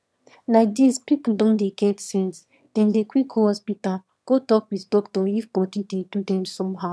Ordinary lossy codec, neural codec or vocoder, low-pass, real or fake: none; autoencoder, 22.05 kHz, a latent of 192 numbers a frame, VITS, trained on one speaker; none; fake